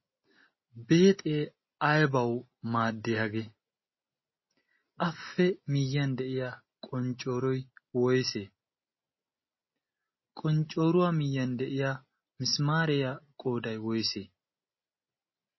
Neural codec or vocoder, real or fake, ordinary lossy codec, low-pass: none; real; MP3, 24 kbps; 7.2 kHz